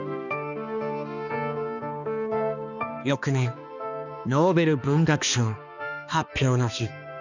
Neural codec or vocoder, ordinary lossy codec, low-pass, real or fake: codec, 16 kHz, 2 kbps, X-Codec, HuBERT features, trained on balanced general audio; none; 7.2 kHz; fake